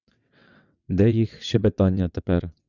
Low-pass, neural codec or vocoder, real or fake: 7.2 kHz; vocoder, 22.05 kHz, 80 mel bands, WaveNeXt; fake